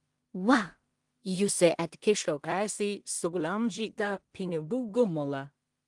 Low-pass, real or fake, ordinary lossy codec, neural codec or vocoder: 10.8 kHz; fake; Opus, 24 kbps; codec, 16 kHz in and 24 kHz out, 0.4 kbps, LongCat-Audio-Codec, two codebook decoder